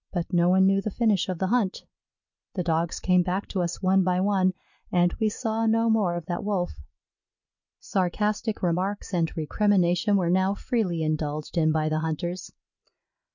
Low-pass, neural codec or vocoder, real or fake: 7.2 kHz; none; real